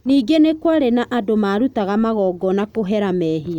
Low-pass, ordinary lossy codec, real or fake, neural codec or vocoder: 19.8 kHz; none; real; none